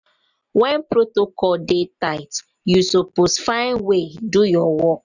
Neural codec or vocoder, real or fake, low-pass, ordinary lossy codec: none; real; 7.2 kHz; none